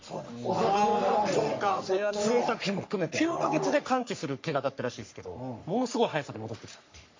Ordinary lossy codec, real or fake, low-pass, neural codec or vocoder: MP3, 48 kbps; fake; 7.2 kHz; codec, 44.1 kHz, 3.4 kbps, Pupu-Codec